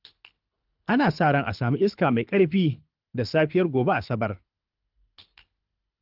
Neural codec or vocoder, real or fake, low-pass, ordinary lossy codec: codec, 24 kHz, 6 kbps, HILCodec; fake; 5.4 kHz; Opus, 32 kbps